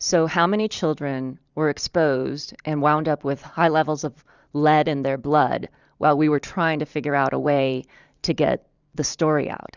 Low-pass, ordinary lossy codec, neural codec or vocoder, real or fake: 7.2 kHz; Opus, 64 kbps; vocoder, 44.1 kHz, 128 mel bands every 512 samples, BigVGAN v2; fake